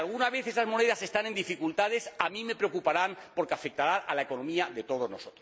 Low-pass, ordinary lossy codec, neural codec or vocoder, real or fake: none; none; none; real